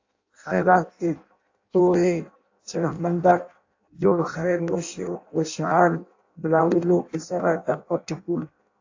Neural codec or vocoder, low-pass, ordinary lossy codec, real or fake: codec, 16 kHz in and 24 kHz out, 0.6 kbps, FireRedTTS-2 codec; 7.2 kHz; AAC, 48 kbps; fake